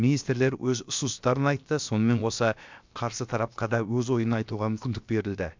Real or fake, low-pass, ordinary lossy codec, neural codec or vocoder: fake; 7.2 kHz; MP3, 64 kbps; codec, 16 kHz, about 1 kbps, DyCAST, with the encoder's durations